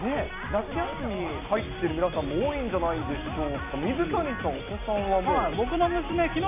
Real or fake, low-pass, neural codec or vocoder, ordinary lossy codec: real; 3.6 kHz; none; MP3, 32 kbps